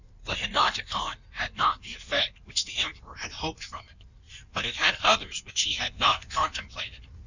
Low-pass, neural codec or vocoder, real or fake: 7.2 kHz; codec, 16 kHz in and 24 kHz out, 1.1 kbps, FireRedTTS-2 codec; fake